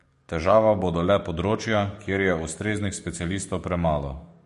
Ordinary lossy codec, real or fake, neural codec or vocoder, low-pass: MP3, 48 kbps; fake; codec, 44.1 kHz, 7.8 kbps, DAC; 14.4 kHz